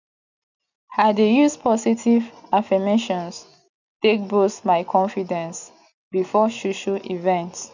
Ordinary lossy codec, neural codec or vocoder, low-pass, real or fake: none; none; 7.2 kHz; real